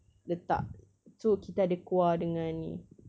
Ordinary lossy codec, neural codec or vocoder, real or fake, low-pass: none; none; real; none